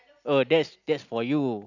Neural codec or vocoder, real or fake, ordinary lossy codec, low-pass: none; real; none; 7.2 kHz